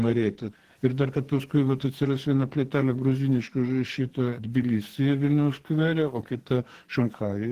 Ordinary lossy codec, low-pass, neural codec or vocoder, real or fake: Opus, 16 kbps; 14.4 kHz; codec, 44.1 kHz, 2.6 kbps, SNAC; fake